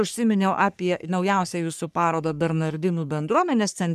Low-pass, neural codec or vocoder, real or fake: 14.4 kHz; codec, 44.1 kHz, 3.4 kbps, Pupu-Codec; fake